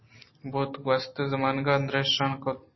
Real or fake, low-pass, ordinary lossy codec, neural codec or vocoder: real; 7.2 kHz; MP3, 24 kbps; none